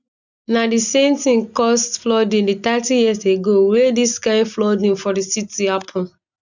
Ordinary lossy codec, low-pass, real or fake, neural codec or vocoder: none; 7.2 kHz; real; none